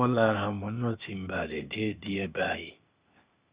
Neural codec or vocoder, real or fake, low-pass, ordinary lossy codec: codec, 16 kHz, 0.8 kbps, ZipCodec; fake; 3.6 kHz; Opus, 64 kbps